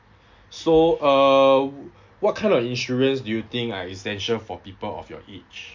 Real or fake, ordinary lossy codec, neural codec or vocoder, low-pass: real; MP3, 48 kbps; none; 7.2 kHz